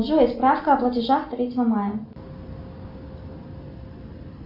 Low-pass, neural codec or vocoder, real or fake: 5.4 kHz; none; real